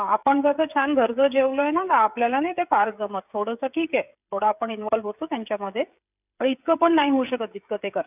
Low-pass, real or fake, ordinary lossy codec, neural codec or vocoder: 3.6 kHz; fake; none; codec, 16 kHz, 16 kbps, FreqCodec, smaller model